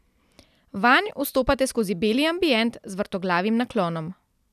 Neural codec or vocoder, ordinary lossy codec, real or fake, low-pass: none; none; real; 14.4 kHz